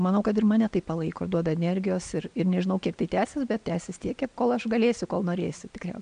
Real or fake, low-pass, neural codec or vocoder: real; 9.9 kHz; none